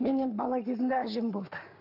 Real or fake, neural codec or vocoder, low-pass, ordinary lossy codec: fake; codec, 44.1 kHz, 7.8 kbps, Pupu-Codec; 5.4 kHz; AAC, 48 kbps